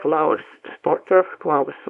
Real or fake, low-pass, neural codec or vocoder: fake; 10.8 kHz; codec, 24 kHz, 0.9 kbps, WavTokenizer, small release